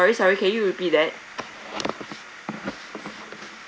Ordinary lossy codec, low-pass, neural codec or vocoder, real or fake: none; none; none; real